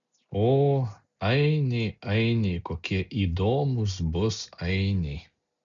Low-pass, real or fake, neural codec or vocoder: 7.2 kHz; real; none